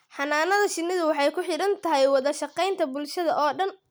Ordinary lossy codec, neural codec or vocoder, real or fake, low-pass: none; none; real; none